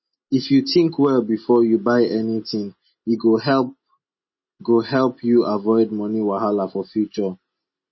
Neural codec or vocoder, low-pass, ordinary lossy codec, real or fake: none; 7.2 kHz; MP3, 24 kbps; real